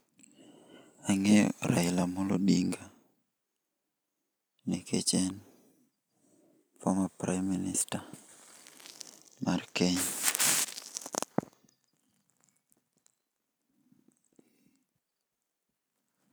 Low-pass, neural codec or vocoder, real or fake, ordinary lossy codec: none; none; real; none